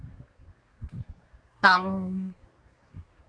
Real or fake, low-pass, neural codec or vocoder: fake; 9.9 kHz; codec, 24 kHz, 1 kbps, SNAC